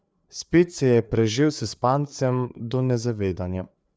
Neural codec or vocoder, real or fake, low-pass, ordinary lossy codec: codec, 16 kHz, 4 kbps, FreqCodec, larger model; fake; none; none